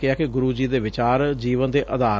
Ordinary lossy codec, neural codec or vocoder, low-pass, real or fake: none; none; none; real